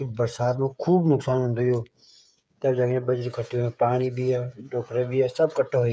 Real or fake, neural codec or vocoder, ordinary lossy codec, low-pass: fake; codec, 16 kHz, 8 kbps, FreqCodec, smaller model; none; none